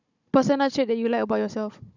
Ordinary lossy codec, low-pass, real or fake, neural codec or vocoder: none; 7.2 kHz; fake; codec, 16 kHz, 16 kbps, FunCodec, trained on Chinese and English, 50 frames a second